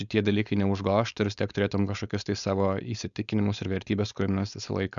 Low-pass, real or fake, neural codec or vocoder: 7.2 kHz; fake; codec, 16 kHz, 4.8 kbps, FACodec